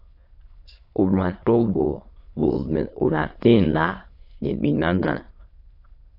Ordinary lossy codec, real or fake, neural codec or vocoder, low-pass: AAC, 32 kbps; fake; autoencoder, 22.05 kHz, a latent of 192 numbers a frame, VITS, trained on many speakers; 5.4 kHz